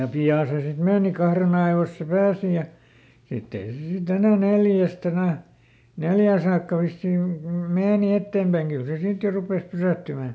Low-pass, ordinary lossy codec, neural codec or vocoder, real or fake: none; none; none; real